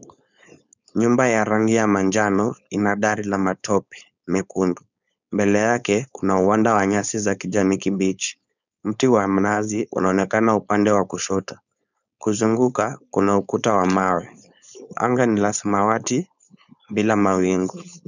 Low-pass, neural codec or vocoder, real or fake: 7.2 kHz; codec, 16 kHz, 4.8 kbps, FACodec; fake